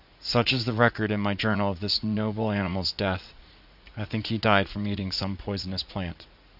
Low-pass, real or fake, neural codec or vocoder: 5.4 kHz; fake; vocoder, 44.1 kHz, 80 mel bands, Vocos